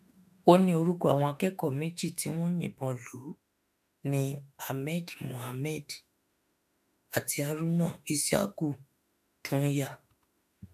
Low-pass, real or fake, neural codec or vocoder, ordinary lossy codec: 14.4 kHz; fake; autoencoder, 48 kHz, 32 numbers a frame, DAC-VAE, trained on Japanese speech; none